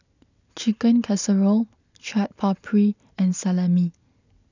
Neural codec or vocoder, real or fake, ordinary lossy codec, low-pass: none; real; none; 7.2 kHz